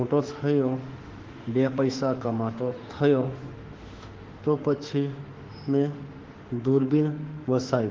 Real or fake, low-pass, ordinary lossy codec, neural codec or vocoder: fake; 7.2 kHz; Opus, 16 kbps; autoencoder, 48 kHz, 32 numbers a frame, DAC-VAE, trained on Japanese speech